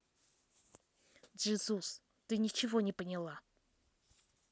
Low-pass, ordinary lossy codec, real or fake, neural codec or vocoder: none; none; real; none